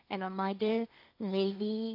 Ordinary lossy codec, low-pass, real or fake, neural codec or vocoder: AAC, 32 kbps; 5.4 kHz; fake; codec, 16 kHz, 1.1 kbps, Voila-Tokenizer